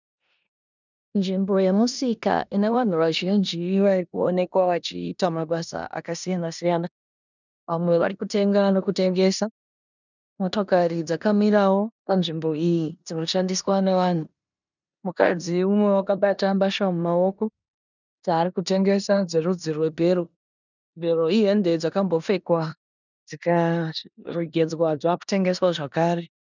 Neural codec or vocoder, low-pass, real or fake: codec, 16 kHz in and 24 kHz out, 0.9 kbps, LongCat-Audio-Codec, four codebook decoder; 7.2 kHz; fake